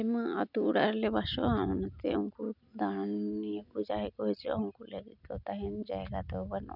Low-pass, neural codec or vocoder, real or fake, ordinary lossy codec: 5.4 kHz; none; real; none